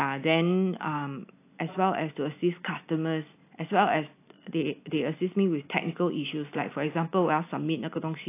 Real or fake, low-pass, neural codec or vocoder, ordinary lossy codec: real; 3.6 kHz; none; AAC, 24 kbps